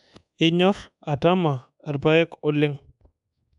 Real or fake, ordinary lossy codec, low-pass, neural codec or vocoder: fake; none; 10.8 kHz; codec, 24 kHz, 1.2 kbps, DualCodec